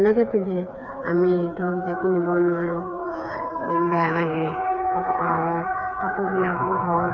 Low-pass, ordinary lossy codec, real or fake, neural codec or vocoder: 7.2 kHz; none; fake; codec, 16 kHz, 4 kbps, FreqCodec, smaller model